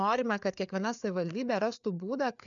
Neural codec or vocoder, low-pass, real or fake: codec, 16 kHz, 4 kbps, FreqCodec, larger model; 7.2 kHz; fake